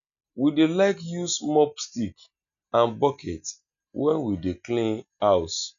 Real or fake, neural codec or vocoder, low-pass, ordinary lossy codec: real; none; 7.2 kHz; none